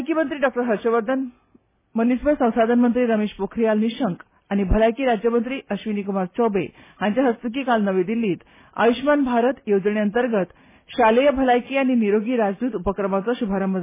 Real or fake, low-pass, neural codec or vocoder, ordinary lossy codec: real; 3.6 kHz; none; MP3, 16 kbps